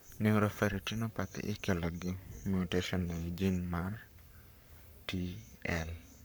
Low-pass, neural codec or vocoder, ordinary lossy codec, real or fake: none; codec, 44.1 kHz, 7.8 kbps, Pupu-Codec; none; fake